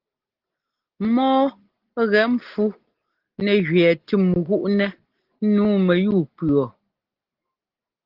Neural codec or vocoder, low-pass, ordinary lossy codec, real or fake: none; 5.4 kHz; Opus, 16 kbps; real